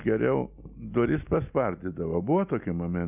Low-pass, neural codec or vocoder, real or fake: 3.6 kHz; none; real